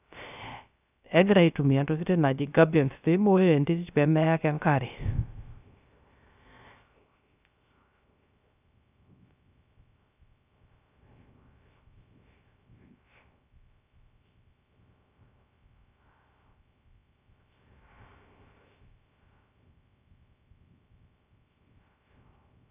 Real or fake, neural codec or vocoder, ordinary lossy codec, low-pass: fake; codec, 16 kHz, 0.3 kbps, FocalCodec; none; 3.6 kHz